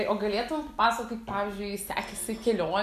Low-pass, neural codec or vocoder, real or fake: 14.4 kHz; none; real